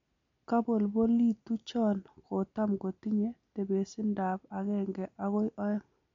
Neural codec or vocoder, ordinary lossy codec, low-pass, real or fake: none; MP3, 48 kbps; 7.2 kHz; real